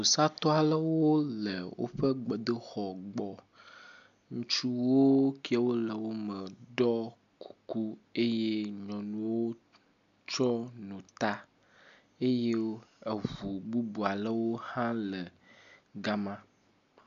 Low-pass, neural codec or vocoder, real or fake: 7.2 kHz; none; real